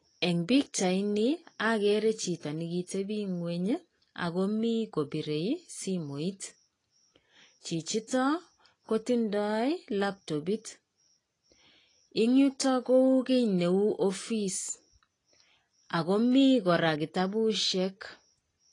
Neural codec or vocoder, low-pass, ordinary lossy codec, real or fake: none; 10.8 kHz; AAC, 32 kbps; real